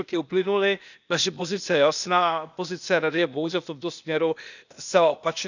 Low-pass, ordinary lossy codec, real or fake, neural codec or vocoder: 7.2 kHz; none; fake; codec, 16 kHz, 0.8 kbps, ZipCodec